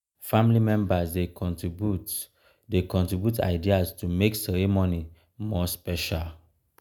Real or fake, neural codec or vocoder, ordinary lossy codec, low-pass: real; none; none; none